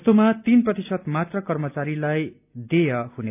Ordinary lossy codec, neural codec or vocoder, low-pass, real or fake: none; none; 3.6 kHz; real